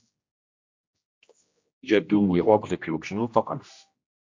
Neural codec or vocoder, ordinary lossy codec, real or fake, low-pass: codec, 16 kHz, 1 kbps, X-Codec, HuBERT features, trained on general audio; MP3, 48 kbps; fake; 7.2 kHz